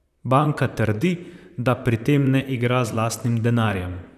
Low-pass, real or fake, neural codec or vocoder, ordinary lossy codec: 14.4 kHz; fake; vocoder, 44.1 kHz, 128 mel bands, Pupu-Vocoder; none